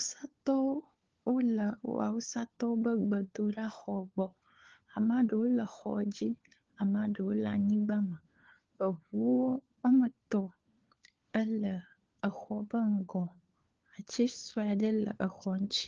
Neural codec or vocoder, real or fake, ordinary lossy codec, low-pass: codec, 16 kHz, 4 kbps, FunCodec, trained on LibriTTS, 50 frames a second; fake; Opus, 16 kbps; 7.2 kHz